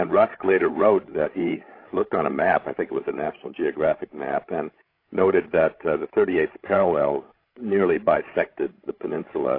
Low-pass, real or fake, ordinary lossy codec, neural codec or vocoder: 5.4 kHz; fake; AAC, 32 kbps; codec, 16 kHz, 16 kbps, FreqCodec, larger model